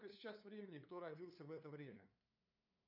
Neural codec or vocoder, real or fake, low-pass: codec, 16 kHz, 2 kbps, FunCodec, trained on LibriTTS, 25 frames a second; fake; 5.4 kHz